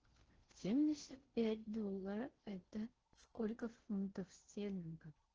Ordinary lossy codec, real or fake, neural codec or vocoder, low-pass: Opus, 16 kbps; fake; codec, 16 kHz in and 24 kHz out, 0.6 kbps, FocalCodec, streaming, 4096 codes; 7.2 kHz